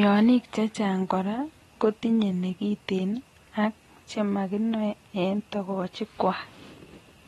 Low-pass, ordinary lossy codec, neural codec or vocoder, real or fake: 19.8 kHz; AAC, 32 kbps; none; real